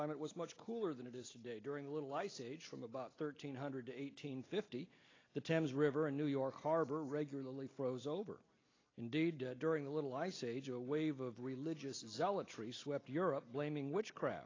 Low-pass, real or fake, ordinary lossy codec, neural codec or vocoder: 7.2 kHz; real; AAC, 32 kbps; none